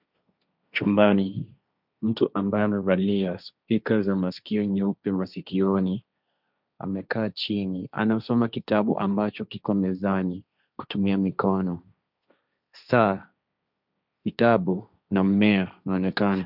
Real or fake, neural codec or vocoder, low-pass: fake; codec, 16 kHz, 1.1 kbps, Voila-Tokenizer; 5.4 kHz